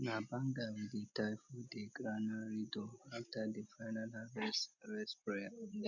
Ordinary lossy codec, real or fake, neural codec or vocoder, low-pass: none; real; none; 7.2 kHz